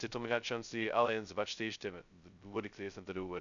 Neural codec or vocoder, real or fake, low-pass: codec, 16 kHz, 0.2 kbps, FocalCodec; fake; 7.2 kHz